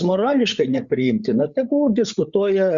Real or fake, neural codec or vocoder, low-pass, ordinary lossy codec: fake; codec, 16 kHz, 8 kbps, FreqCodec, larger model; 7.2 kHz; Opus, 64 kbps